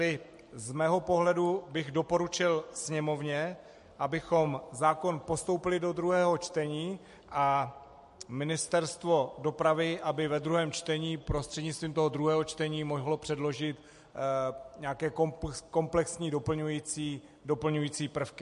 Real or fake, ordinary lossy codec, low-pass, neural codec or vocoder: real; MP3, 48 kbps; 14.4 kHz; none